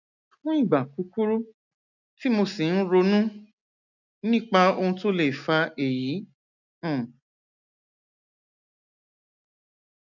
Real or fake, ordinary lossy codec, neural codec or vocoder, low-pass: real; none; none; 7.2 kHz